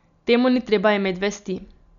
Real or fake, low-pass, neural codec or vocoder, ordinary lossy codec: real; 7.2 kHz; none; none